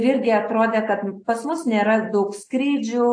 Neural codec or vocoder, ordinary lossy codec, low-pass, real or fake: none; AAC, 48 kbps; 9.9 kHz; real